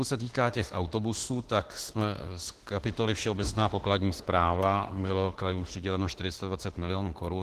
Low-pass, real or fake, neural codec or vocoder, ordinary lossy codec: 14.4 kHz; fake; autoencoder, 48 kHz, 32 numbers a frame, DAC-VAE, trained on Japanese speech; Opus, 16 kbps